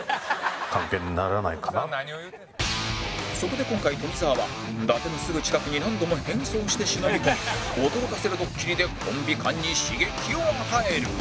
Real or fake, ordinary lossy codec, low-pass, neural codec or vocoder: real; none; none; none